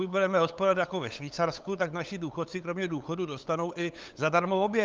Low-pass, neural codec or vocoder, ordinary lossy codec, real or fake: 7.2 kHz; codec, 16 kHz, 8 kbps, FunCodec, trained on LibriTTS, 25 frames a second; Opus, 24 kbps; fake